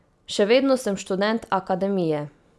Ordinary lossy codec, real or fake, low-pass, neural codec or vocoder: none; real; none; none